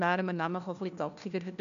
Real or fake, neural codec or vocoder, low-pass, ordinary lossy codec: fake; codec, 16 kHz, 1 kbps, FunCodec, trained on LibriTTS, 50 frames a second; 7.2 kHz; none